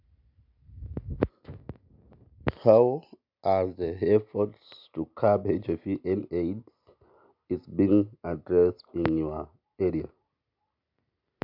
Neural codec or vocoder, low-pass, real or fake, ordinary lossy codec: none; 5.4 kHz; real; MP3, 48 kbps